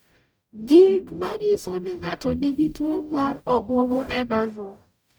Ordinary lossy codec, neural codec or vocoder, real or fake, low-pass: none; codec, 44.1 kHz, 0.9 kbps, DAC; fake; none